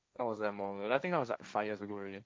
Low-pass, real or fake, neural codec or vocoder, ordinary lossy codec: none; fake; codec, 16 kHz, 1.1 kbps, Voila-Tokenizer; none